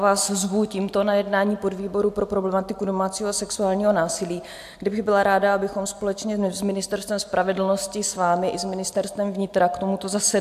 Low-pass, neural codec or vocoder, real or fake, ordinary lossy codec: 14.4 kHz; none; real; AAC, 96 kbps